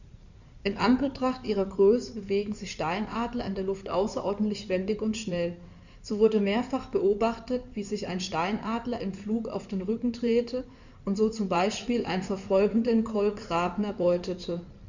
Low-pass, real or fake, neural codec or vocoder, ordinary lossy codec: 7.2 kHz; fake; codec, 16 kHz in and 24 kHz out, 2.2 kbps, FireRedTTS-2 codec; none